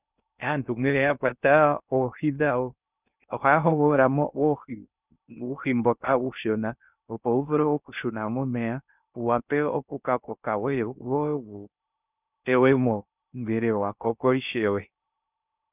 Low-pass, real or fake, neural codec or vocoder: 3.6 kHz; fake; codec, 16 kHz in and 24 kHz out, 0.6 kbps, FocalCodec, streaming, 2048 codes